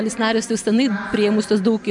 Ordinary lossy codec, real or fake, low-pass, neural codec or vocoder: MP3, 96 kbps; real; 10.8 kHz; none